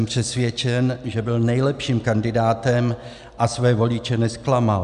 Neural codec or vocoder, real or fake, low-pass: none; real; 10.8 kHz